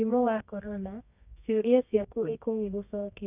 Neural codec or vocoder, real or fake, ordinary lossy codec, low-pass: codec, 24 kHz, 0.9 kbps, WavTokenizer, medium music audio release; fake; none; 3.6 kHz